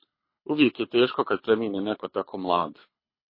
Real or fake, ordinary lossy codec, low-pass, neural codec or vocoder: fake; MP3, 24 kbps; 5.4 kHz; codec, 24 kHz, 6 kbps, HILCodec